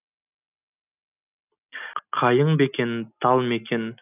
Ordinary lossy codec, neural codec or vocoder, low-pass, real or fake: none; none; 3.6 kHz; real